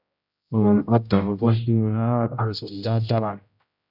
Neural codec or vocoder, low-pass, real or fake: codec, 16 kHz, 0.5 kbps, X-Codec, HuBERT features, trained on general audio; 5.4 kHz; fake